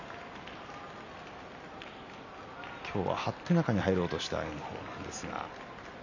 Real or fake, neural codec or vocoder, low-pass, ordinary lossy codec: real; none; 7.2 kHz; none